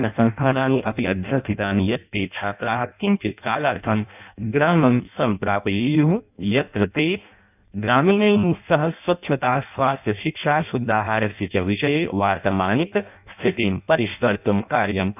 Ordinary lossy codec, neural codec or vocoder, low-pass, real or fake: none; codec, 16 kHz in and 24 kHz out, 0.6 kbps, FireRedTTS-2 codec; 3.6 kHz; fake